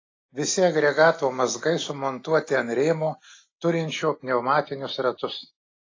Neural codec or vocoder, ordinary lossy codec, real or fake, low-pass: none; AAC, 32 kbps; real; 7.2 kHz